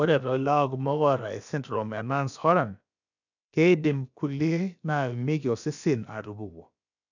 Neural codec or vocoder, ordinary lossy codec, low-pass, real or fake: codec, 16 kHz, about 1 kbps, DyCAST, with the encoder's durations; none; 7.2 kHz; fake